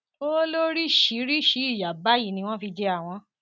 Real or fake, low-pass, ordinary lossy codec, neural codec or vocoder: real; none; none; none